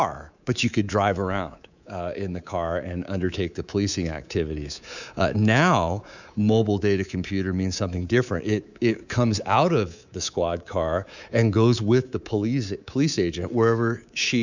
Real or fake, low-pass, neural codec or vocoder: fake; 7.2 kHz; codec, 24 kHz, 3.1 kbps, DualCodec